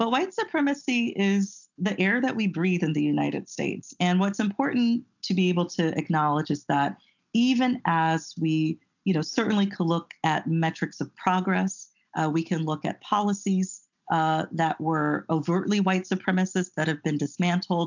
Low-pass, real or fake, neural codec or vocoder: 7.2 kHz; real; none